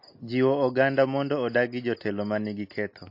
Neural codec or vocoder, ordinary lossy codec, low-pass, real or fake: none; MP3, 32 kbps; 5.4 kHz; real